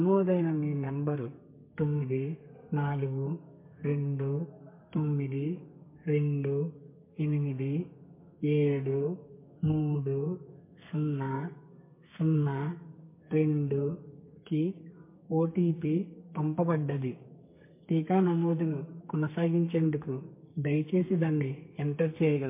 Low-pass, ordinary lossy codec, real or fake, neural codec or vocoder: 3.6 kHz; MP3, 24 kbps; fake; codec, 32 kHz, 1.9 kbps, SNAC